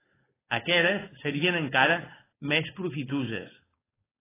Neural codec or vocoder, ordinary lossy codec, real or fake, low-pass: codec, 16 kHz, 4.8 kbps, FACodec; AAC, 16 kbps; fake; 3.6 kHz